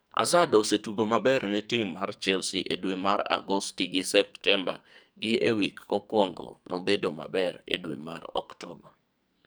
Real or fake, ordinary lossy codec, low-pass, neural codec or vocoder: fake; none; none; codec, 44.1 kHz, 2.6 kbps, SNAC